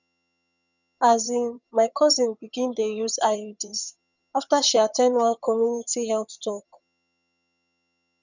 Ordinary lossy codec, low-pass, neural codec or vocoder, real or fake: none; 7.2 kHz; vocoder, 22.05 kHz, 80 mel bands, HiFi-GAN; fake